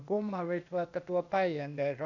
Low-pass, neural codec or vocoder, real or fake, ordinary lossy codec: 7.2 kHz; codec, 16 kHz, 0.8 kbps, ZipCodec; fake; none